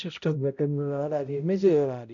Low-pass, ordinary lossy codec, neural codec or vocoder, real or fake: 7.2 kHz; MP3, 96 kbps; codec, 16 kHz, 0.5 kbps, X-Codec, HuBERT features, trained on balanced general audio; fake